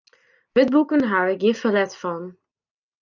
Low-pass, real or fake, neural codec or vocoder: 7.2 kHz; real; none